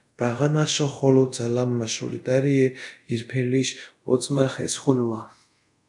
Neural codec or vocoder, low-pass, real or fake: codec, 24 kHz, 0.5 kbps, DualCodec; 10.8 kHz; fake